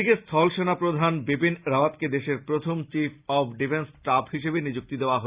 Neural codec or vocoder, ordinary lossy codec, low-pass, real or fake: none; Opus, 64 kbps; 3.6 kHz; real